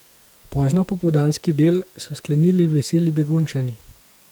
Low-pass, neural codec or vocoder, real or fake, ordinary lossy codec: none; codec, 44.1 kHz, 2.6 kbps, SNAC; fake; none